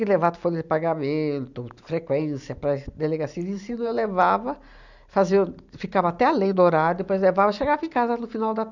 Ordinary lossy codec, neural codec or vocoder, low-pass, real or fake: none; none; 7.2 kHz; real